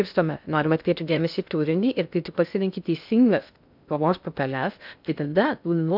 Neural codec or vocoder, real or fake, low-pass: codec, 16 kHz in and 24 kHz out, 0.6 kbps, FocalCodec, streaming, 2048 codes; fake; 5.4 kHz